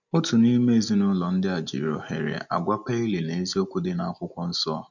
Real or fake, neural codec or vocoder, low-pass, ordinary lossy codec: real; none; 7.2 kHz; none